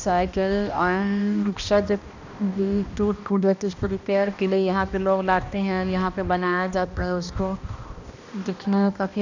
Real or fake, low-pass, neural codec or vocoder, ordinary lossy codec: fake; 7.2 kHz; codec, 16 kHz, 1 kbps, X-Codec, HuBERT features, trained on balanced general audio; none